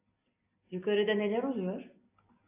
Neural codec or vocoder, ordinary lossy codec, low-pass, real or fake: none; AAC, 32 kbps; 3.6 kHz; real